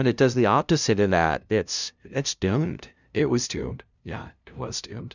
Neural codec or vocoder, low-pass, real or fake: codec, 16 kHz, 0.5 kbps, FunCodec, trained on LibriTTS, 25 frames a second; 7.2 kHz; fake